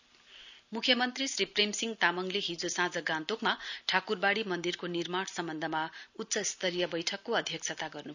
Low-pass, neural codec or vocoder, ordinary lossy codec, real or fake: 7.2 kHz; none; none; real